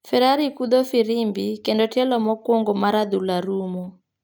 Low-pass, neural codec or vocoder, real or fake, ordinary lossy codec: none; none; real; none